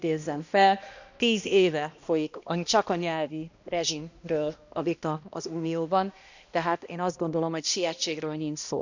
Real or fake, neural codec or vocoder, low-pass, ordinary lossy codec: fake; codec, 16 kHz, 1 kbps, X-Codec, HuBERT features, trained on balanced general audio; 7.2 kHz; none